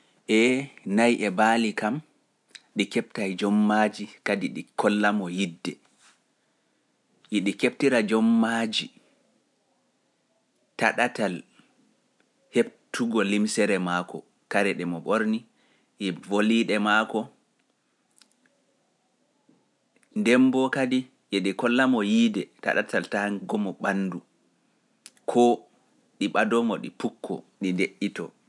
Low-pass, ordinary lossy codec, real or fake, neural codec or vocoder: none; none; real; none